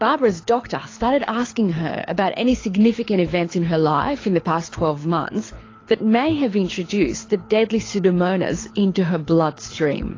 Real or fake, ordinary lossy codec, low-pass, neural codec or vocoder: fake; AAC, 32 kbps; 7.2 kHz; codec, 24 kHz, 6 kbps, HILCodec